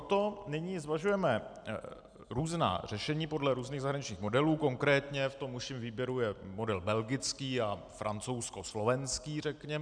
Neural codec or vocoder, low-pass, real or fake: none; 9.9 kHz; real